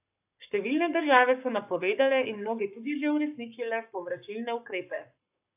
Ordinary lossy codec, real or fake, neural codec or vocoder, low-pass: AAC, 32 kbps; fake; codec, 44.1 kHz, 7.8 kbps, Pupu-Codec; 3.6 kHz